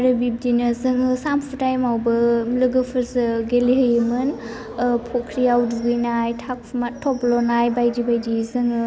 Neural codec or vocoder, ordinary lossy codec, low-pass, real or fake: none; none; none; real